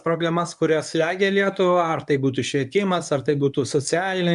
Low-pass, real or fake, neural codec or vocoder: 10.8 kHz; fake; codec, 24 kHz, 0.9 kbps, WavTokenizer, medium speech release version 1